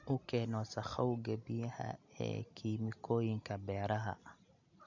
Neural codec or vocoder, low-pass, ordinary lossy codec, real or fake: none; 7.2 kHz; none; real